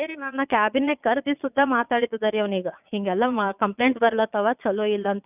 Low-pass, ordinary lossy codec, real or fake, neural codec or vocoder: 3.6 kHz; none; fake; vocoder, 22.05 kHz, 80 mel bands, Vocos